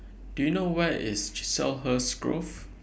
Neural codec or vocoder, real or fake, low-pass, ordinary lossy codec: none; real; none; none